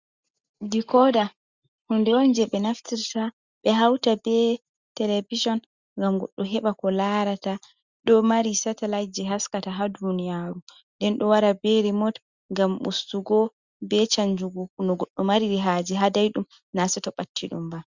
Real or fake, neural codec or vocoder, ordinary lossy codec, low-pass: real; none; Opus, 64 kbps; 7.2 kHz